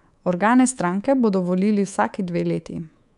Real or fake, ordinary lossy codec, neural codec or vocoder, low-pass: fake; none; codec, 24 kHz, 3.1 kbps, DualCodec; 10.8 kHz